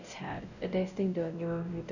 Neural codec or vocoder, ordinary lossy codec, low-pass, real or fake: codec, 16 kHz, 0.5 kbps, X-Codec, WavLM features, trained on Multilingual LibriSpeech; none; 7.2 kHz; fake